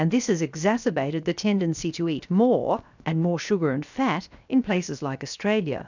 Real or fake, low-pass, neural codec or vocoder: fake; 7.2 kHz; codec, 16 kHz, 0.7 kbps, FocalCodec